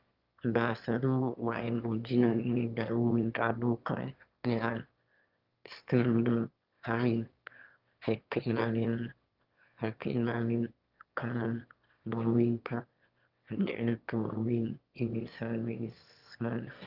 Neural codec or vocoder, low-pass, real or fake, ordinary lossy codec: autoencoder, 22.05 kHz, a latent of 192 numbers a frame, VITS, trained on one speaker; 5.4 kHz; fake; Opus, 24 kbps